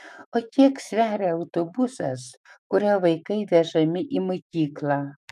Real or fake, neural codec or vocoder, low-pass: fake; autoencoder, 48 kHz, 128 numbers a frame, DAC-VAE, trained on Japanese speech; 14.4 kHz